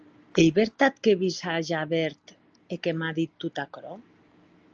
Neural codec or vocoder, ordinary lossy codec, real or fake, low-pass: none; Opus, 24 kbps; real; 7.2 kHz